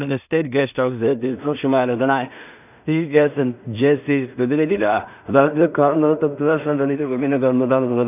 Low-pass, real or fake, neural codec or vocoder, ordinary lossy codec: 3.6 kHz; fake; codec, 16 kHz in and 24 kHz out, 0.4 kbps, LongCat-Audio-Codec, two codebook decoder; none